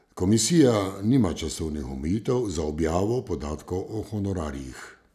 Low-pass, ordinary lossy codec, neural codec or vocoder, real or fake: 14.4 kHz; none; none; real